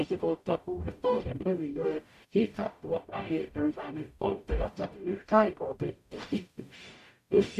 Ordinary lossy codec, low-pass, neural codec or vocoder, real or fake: MP3, 64 kbps; 14.4 kHz; codec, 44.1 kHz, 0.9 kbps, DAC; fake